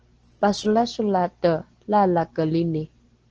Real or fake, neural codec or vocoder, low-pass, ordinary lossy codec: real; none; 7.2 kHz; Opus, 16 kbps